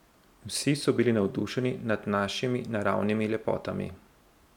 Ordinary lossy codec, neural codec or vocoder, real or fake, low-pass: none; none; real; 19.8 kHz